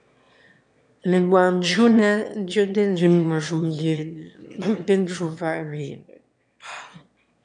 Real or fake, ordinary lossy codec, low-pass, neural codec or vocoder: fake; MP3, 96 kbps; 9.9 kHz; autoencoder, 22.05 kHz, a latent of 192 numbers a frame, VITS, trained on one speaker